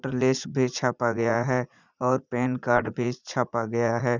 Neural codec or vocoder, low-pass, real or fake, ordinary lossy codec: vocoder, 44.1 kHz, 80 mel bands, Vocos; 7.2 kHz; fake; none